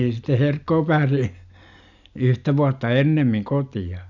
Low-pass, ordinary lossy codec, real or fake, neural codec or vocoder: 7.2 kHz; none; real; none